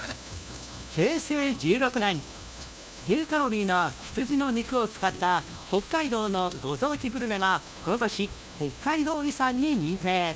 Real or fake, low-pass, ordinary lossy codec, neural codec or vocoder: fake; none; none; codec, 16 kHz, 1 kbps, FunCodec, trained on LibriTTS, 50 frames a second